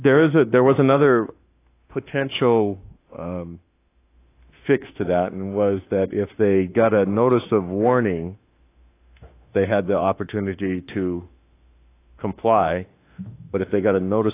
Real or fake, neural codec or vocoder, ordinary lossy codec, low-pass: fake; autoencoder, 48 kHz, 32 numbers a frame, DAC-VAE, trained on Japanese speech; AAC, 24 kbps; 3.6 kHz